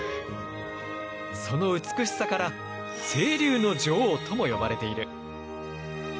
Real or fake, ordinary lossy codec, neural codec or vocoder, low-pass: real; none; none; none